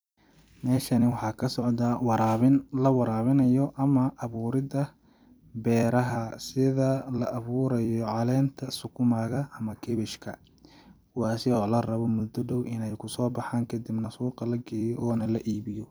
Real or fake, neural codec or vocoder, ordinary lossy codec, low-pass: fake; vocoder, 44.1 kHz, 128 mel bands every 256 samples, BigVGAN v2; none; none